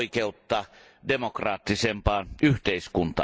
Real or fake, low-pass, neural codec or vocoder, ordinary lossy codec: real; none; none; none